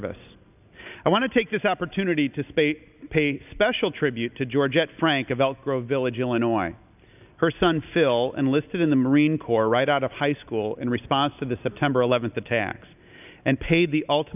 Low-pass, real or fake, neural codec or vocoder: 3.6 kHz; real; none